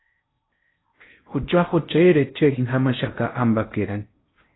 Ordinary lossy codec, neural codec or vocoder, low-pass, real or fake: AAC, 16 kbps; codec, 16 kHz in and 24 kHz out, 0.6 kbps, FocalCodec, streaming, 4096 codes; 7.2 kHz; fake